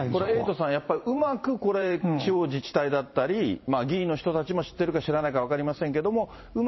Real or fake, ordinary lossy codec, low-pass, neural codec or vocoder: fake; MP3, 24 kbps; 7.2 kHz; vocoder, 44.1 kHz, 128 mel bands every 512 samples, BigVGAN v2